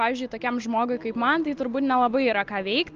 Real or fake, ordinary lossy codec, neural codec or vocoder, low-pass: real; Opus, 24 kbps; none; 7.2 kHz